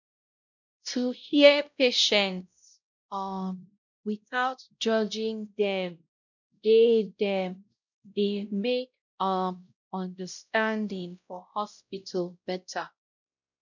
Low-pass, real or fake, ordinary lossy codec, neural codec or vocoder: 7.2 kHz; fake; none; codec, 16 kHz, 0.5 kbps, X-Codec, WavLM features, trained on Multilingual LibriSpeech